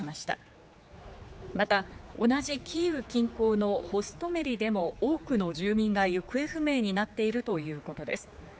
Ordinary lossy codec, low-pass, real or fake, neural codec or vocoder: none; none; fake; codec, 16 kHz, 4 kbps, X-Codec, HuBERT features, trained on general audio